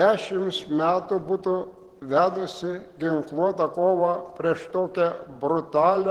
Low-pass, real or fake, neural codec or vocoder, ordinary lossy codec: 19.8 kHz; fake; vocoder, 48 kHz, 128 mel bands, Vocos; Opus, 16 kbps